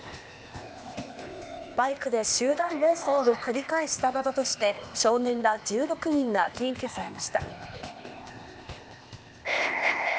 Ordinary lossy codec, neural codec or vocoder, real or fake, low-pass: none; codec, 16 kHz, 0.8 kbps, ZipCodec; fake; none